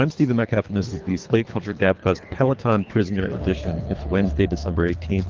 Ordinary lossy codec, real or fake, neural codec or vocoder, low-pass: Opus, 32 kbps; fake; codec, 24 kHz, 3 kbps, HILCodec; 7.2 kHz